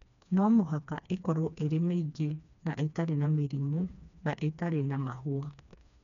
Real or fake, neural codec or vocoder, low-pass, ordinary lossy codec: fake; codec, 16 kHz, 2 kbps, FreqCodec, smaller model; 7.2 kHz; none